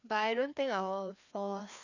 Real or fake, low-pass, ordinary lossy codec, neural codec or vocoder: fake; 7.2 kHz; none; codec, 16 kHz, 2 kbps, FreqCodec, larger model